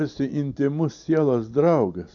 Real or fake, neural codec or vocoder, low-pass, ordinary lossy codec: real; none; 7.2 kHz; MP3, 64 kbps